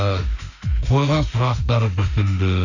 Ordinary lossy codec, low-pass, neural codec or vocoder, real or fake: none; 7.2 kHz; autoencoder, 48 kHz, 32 numbers a frame, DAC-VAE, trained on Japanese speech; fake